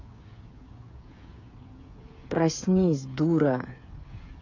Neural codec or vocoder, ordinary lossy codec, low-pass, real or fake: codec, 16 kHz, 8 kbps, FreqCodec, smaller model; AAC, 48 kbps; 7.2 kHz; fake